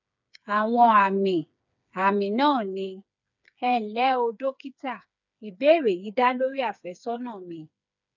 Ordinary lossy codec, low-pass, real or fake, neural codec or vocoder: none; 7.2 kHz; fake; codec, 16 kHz, 4 kbps, FreqCodec, smaller model